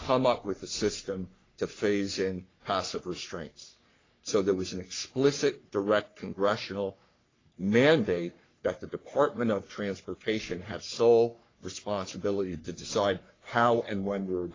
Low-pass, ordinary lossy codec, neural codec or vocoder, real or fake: 7.2 kHz; AAC, 32 kbps; codec, 44.1 kHz, 3.4 kbps, Pupu-Codec; fake